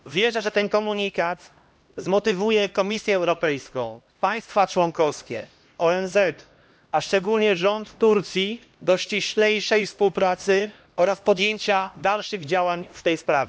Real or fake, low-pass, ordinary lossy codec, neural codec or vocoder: fake; none; none; codec, 16 kHz, 1 kbps, X-Codec, HuBERT features, trained on LibriSpeech